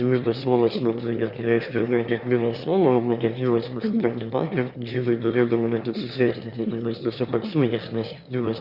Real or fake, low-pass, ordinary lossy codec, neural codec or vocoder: fake; 5.4 kHz; Opus, 64 kbps; autoencoder, 22.05 kHz, a latent of 192 numbers a frame, VITS, trained on one speaker